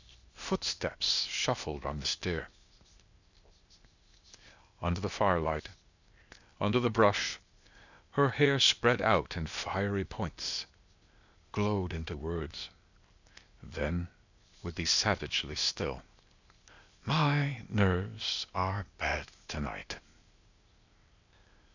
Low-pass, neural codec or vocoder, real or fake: 7.2 kHz; codec, 16 kHz, 0.8 kbps, ZipCodec; fake